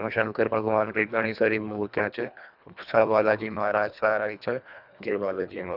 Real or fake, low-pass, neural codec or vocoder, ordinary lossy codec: fake; 5.4 kHz; codec, 24 kHz, 1.5 kbps, HILCodec; none